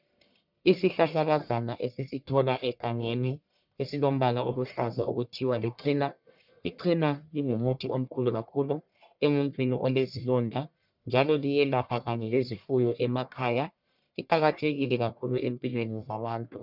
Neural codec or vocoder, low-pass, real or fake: codec, 44.1 kHz, 1.7 kbps, Pupu-Codec; 5.4 kHz; fake